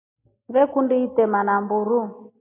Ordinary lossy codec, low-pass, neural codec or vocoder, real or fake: MP3, 24 kbps; 3.6 kHz; none; real